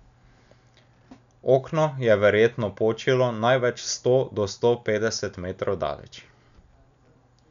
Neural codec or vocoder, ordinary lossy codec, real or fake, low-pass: none; none; real; 7.2 kHz